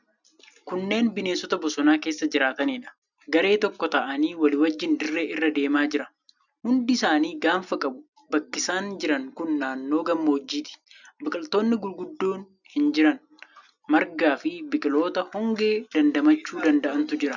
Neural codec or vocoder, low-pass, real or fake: none; 7.2 kHz; real